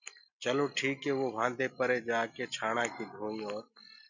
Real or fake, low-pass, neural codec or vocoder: real; 7.2 kHz; none